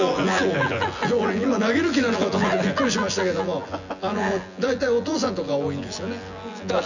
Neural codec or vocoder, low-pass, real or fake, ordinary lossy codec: vocoder, 24 kHz, 100 mel bands, Vocos; 7.2 kHz; fake; none